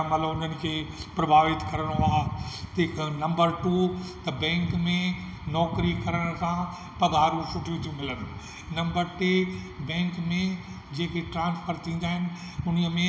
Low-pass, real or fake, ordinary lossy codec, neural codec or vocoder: none; real; none; none